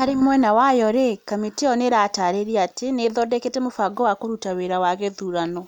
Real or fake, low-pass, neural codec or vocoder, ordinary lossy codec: real; 19.8 kHz; none; none